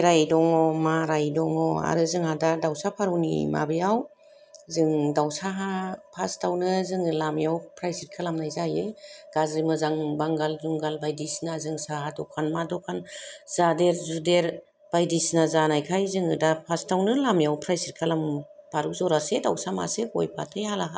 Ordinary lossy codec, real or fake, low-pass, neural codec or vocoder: none; real; none; none